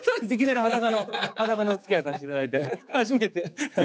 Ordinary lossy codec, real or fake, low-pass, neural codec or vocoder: none; fake; none; codec, 16 kHz, 4 kbps, X-Codec, HuBERT features, trained on general audio